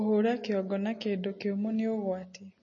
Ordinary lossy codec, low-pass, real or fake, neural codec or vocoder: MP3, 32 kbps; 7.2 kHz; real; none